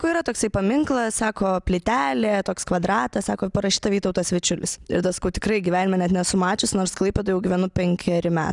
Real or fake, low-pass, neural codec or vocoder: real; 10.8 kHz; none